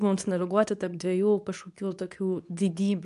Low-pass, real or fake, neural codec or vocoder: 10.8 kHz; fake; codec, 24 kHz, 0.9 kbps, WavTokenizer, medium speech release version 2